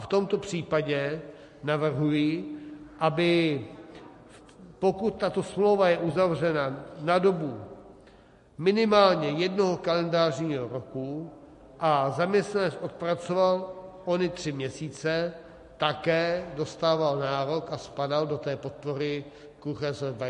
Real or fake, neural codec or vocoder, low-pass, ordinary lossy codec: real; none; 10.8 kHz; MP3, 48 kbps